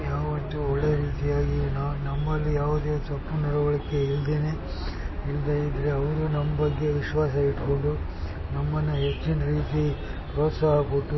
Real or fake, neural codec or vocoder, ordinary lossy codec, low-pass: real; none; MP3, 24 kbps; 7.2 kHz